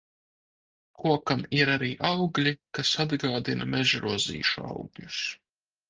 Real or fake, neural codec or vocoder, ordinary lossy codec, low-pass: real; none; Opus, 24 kbps; 7.2 kHz